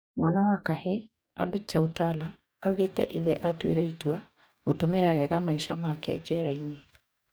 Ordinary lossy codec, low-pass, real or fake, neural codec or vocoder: none; none; fake; codec, 44.1 kHz, 2.6 kbps, DAC